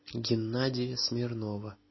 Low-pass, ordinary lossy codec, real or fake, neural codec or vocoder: 7.2 kHz; MP3, 24 kbps; real; none